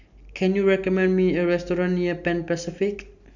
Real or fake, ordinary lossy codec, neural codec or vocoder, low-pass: real; none; none; 7.2 kHz